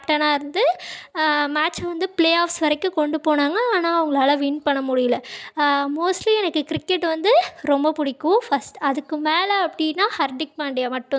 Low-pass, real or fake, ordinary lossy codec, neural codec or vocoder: none; real; none; none